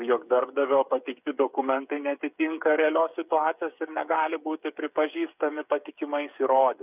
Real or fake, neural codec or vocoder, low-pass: fake; codec, 16 kHz, 8 kbps, FreqCodec, smaller model; 3.6 kHz